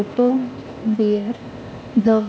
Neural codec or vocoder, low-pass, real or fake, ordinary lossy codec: codec, 16 kHz, 0.8 kbps, ZipCodec; none; fake; none